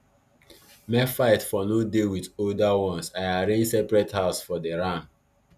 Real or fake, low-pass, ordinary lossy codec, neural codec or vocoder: real; 14.4 kHz; none; none